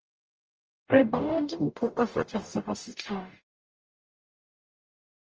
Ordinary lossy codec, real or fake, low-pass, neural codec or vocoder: Opus, 24 kbps; fake; 7.2 kHz; codec, 44.1 kHz, 0.9 kbps, DAC